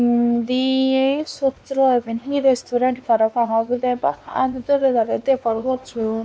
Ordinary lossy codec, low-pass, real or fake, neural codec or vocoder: none; none; fake; codec, 16 kHz, 2 kbps, X-Codec, WavLM features, trained on Multilingual LibriSpeech